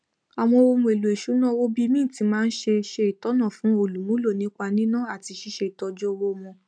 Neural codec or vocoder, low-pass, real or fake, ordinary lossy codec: none; 9.9 kHz; real; none